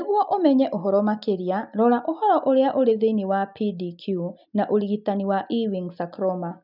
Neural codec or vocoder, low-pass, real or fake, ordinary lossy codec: none; 5.4 kHz; real; none